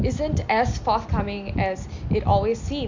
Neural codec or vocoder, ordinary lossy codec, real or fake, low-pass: none; MP3, 48 kbps; real; 7.2 kHz